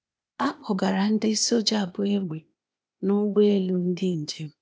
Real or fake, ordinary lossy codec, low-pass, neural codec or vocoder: fake; none; none; codec, 16 kHz, 0.8 kbps, ZipCodec